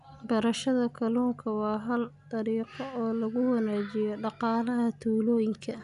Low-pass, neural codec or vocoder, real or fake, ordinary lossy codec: 10.8 kHz; none; real; none